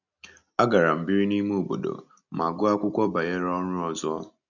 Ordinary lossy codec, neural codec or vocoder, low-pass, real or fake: none; none; 7.2 kHz; real